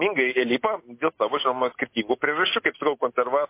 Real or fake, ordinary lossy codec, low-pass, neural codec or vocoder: real; MP3, 24 kbps; 3.6 kHz; none